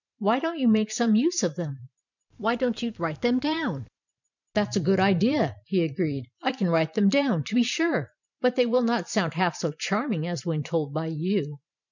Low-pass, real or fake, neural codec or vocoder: 7.2 kHz; fake; vocoder, 44.1 kHz, 128 mel bands every 512 samples, BigVGAN v2